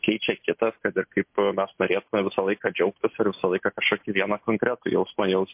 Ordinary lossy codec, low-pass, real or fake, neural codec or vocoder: MP3, 32 kbps; 3.6 kHz; real; none